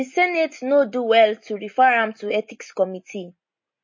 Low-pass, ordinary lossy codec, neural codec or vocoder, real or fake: 7.2 kHz; MP3, 32 kbps; none; real